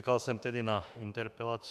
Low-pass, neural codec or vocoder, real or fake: 14.4 kHz; autoencoder, 48 kHz, 32 numbers a frame, DAC-VAE, trained on Japanese speech; fake